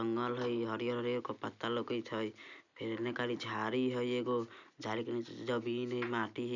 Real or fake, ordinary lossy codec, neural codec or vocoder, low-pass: real; none; none; 7.2 kHz